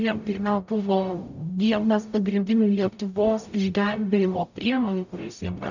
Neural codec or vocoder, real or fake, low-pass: codec, 44.1 kHz, 0.9 kbps, DAC; fake; 7.2 kHz